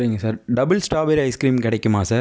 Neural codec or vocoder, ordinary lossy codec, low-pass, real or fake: none; none; none; real